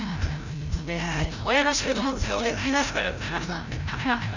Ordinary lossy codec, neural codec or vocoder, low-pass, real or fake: none; codec, 16 kHz, 0.5 kbps, FreqCodec, larger model; 7.2 kHz; fake